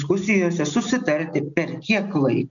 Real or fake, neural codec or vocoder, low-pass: real; none; 7.2 kHz